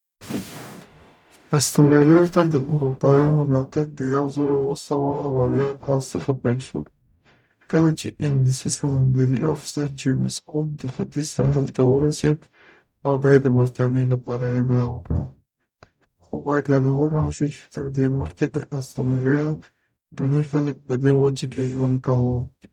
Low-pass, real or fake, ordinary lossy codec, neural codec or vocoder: 19.8 kHz; fake; none; codec, 44.1 kHz, 0.9 kbps, DAC